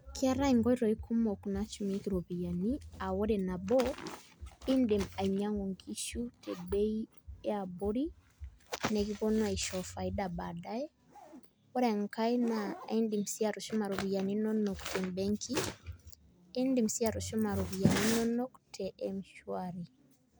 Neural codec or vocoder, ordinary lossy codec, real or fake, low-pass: none; none; real; none